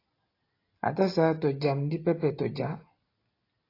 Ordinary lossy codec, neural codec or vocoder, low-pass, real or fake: AAC, 24 kbps; none; 5.4 kHz; real